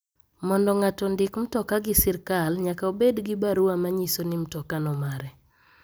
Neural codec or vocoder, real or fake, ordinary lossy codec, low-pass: none; real; none; none